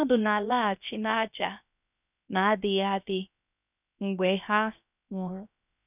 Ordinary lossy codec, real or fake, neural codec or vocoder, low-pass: none; fake; codec, 16 kHz, 0.7 kbps, FocalCodec; 3.6 kHz